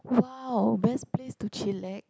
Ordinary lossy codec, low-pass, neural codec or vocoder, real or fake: none; none; none; real